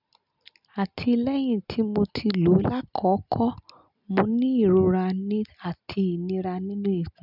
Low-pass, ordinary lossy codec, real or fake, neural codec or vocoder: 5.4 kHz; none; real; none